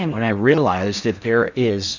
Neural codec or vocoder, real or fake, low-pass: codec, 16 kHz in and 24 kHz out, 0.6 kbps, FocalCodec, streaming, 4096 codes; fake; 7.2 kHz